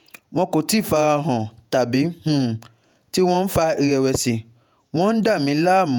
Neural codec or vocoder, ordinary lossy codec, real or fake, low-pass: vocoder, 48 kHz, 128 mel bands, Vocos; none; fake; 19.8 kHz